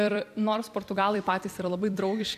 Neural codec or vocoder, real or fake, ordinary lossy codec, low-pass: vocoder, 44.1 kHz, 128 mel bands every 256 samples, BigVGAN v2; fake; MP3, 96 kbps; 14.4 kHz